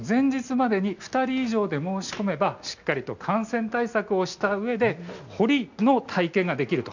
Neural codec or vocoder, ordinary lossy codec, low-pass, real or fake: none; none; 7.2 kHz; real